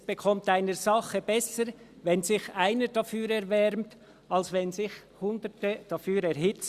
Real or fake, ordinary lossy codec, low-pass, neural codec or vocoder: real; Opus, 64 kbps; 14.4 kHz; none